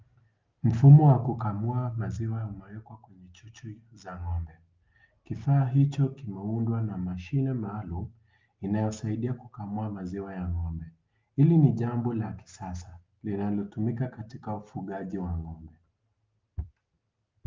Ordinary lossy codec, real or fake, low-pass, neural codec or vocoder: Opus, 24 kbps; real; 7.2 kHz; none